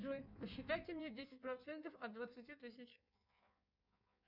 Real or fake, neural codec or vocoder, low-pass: fake; codec, 16 kHz in and 24 kHz out, 1.1 kbps, FireRedTTS-2 codec; 5.4 kHz